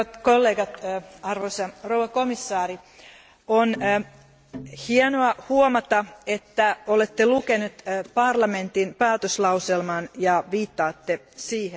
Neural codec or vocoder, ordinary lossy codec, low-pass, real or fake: none; none; none; real